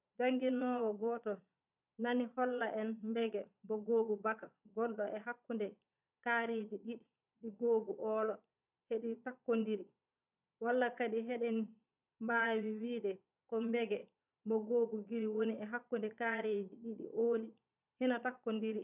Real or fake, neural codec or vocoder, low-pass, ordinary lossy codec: fake; vocoder, 22.05 kHz, 80 mel bands, Vocos; 3.6 kHz; none